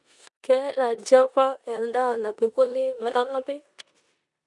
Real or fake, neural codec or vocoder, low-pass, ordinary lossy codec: fake; codec, 16 kHz in and 24 kHz out, 0.9 kbps, LongCat-Audio-Codec, four codebook decoder; 10.8 kHz; none